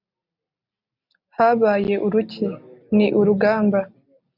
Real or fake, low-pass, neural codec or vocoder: real; 5.4 kHz; none